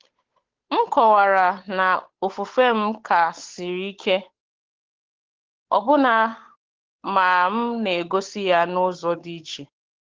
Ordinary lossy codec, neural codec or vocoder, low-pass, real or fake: Opus, 16 kbps; codec, 16 kHz, 8 kbps, FunCodec, trained on Chinese and English, 25 frames a second; 7.2 kHz; fake